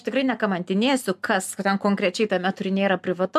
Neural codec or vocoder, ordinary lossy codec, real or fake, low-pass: none; MP3, 96 kbps; real; 14.4 kHz